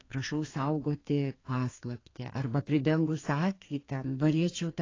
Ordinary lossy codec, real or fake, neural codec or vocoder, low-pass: AAC, 32 kbps; fake; codec, 32 kHz, 1.9 kbps, SNAC; 7.2 kHz